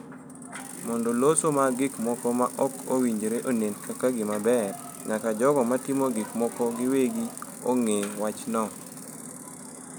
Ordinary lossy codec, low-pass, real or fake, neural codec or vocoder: none; none; real; none